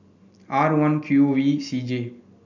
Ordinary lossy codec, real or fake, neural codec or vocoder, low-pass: none; real; none; 7.2 kHz